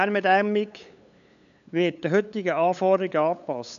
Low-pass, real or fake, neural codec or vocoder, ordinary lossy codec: 7.2 kHz; fake; codec, 16 kHz, 8 kbps, FunCodec, trained on LibriTTS, 25 frames a second; AAC, 96 kbps